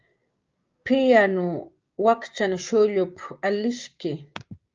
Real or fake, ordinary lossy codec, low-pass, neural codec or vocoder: fake; Opus, 24 kbps; 7.2 kHz; codec, 16 kHz, 6 kbps, DAC